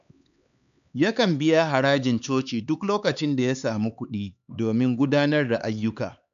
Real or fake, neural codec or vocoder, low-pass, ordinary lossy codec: fake; codec, 16 kHz, 4 kbps, X-Codec, HuBERT features, trained on LibriSpeech; 7.2 kHz; none